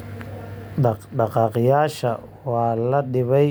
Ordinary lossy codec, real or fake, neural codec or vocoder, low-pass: none; real; none; none